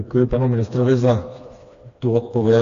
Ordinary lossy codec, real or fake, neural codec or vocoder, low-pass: AAC, 48 kbps; fake; codec, 16 kHz, 2 kbps, FreqCodec, smaller model; 7.2 kHz